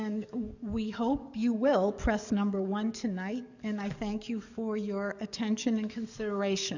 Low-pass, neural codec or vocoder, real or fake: 7.2 kHz; codec, 44.1 kHz, 7.8 kbps, DAC; fake